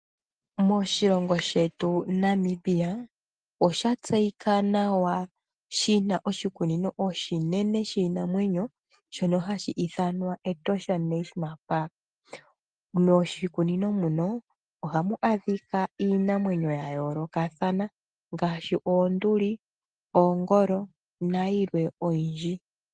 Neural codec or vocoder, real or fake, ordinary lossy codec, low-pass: none; real; Opus, 24 kbps; 9.9 kHz